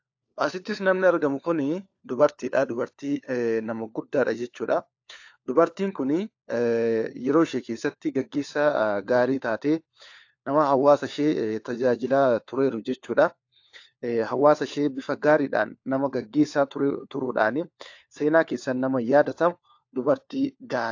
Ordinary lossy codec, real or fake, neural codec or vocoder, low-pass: AAC, 48 kbps; fake; codec, 16 kHz, 4 kbps, FunCodec, trained on LibriTTS, 50 frames a second; 7.2 kHz